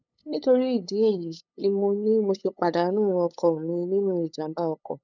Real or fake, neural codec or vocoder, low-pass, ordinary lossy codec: fake; codec, 16 kHz, 8 kbps, FunCodec, trained on LibriTTS, 25 frames a second; 7.2 kHz; none